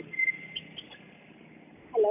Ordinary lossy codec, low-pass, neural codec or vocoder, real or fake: none; 3.6 kHz; none; real